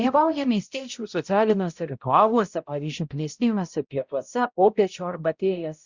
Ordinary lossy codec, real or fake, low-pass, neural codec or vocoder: Opus, 64 kbps; fake; 7.2 kHz; codec, 16 kHz, 0.5 kbps, X-Codec, HuBERT features, trained on balanced general audio